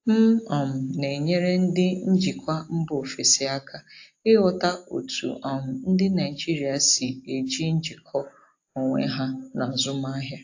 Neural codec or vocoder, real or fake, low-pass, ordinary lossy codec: none; real; 7.2 kHz; AAC, 48 kbps